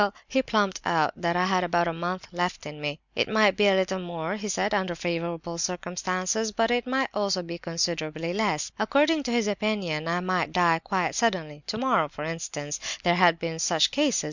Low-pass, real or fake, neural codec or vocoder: 7.2 kHz; real; none